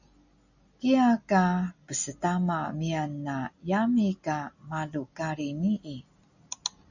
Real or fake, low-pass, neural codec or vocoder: real; 7.2 kHz; none